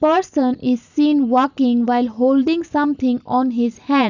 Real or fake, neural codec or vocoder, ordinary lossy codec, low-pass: real; none; none; 7.2 kHz